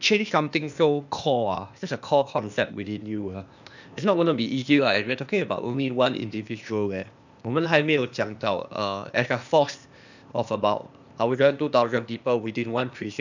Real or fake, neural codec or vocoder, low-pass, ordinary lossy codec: fake; codec, 16 kHz, 0.8 kbps, ZipCodec; 7.2 kHz; none